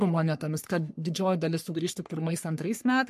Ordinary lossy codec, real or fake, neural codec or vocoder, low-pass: MP3, 64 kbps; fake; codec, 44.1 kHz, 3.4 kbps, Pupu-Codec; 14.4 kHz